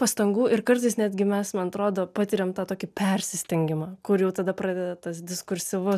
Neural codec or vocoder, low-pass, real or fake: none; 14.4 kHz; real